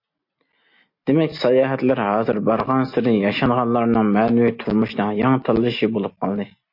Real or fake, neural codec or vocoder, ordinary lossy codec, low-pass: real; none; MP3, 32 kbps; 5.4 kHz